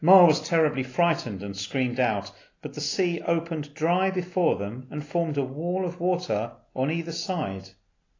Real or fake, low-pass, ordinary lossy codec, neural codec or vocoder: real; 7.2 kHz; AAC, 32 kbps; none